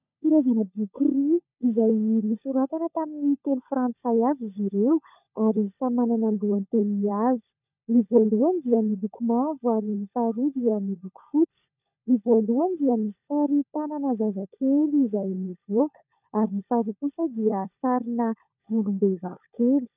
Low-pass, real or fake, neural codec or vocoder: 3.6 kHz; fake; codec, 16 kHz, 16 kbps, FunCodec, trained on LibriTTS, 50 frames a second